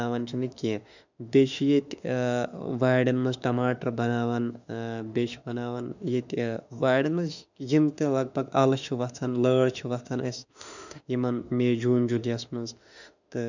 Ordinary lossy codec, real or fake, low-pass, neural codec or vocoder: none; fake; 7.2 kHz; autoencoder, 48 kHz, 32 numbers a frame, DAC-VAE, trained on Japanese speech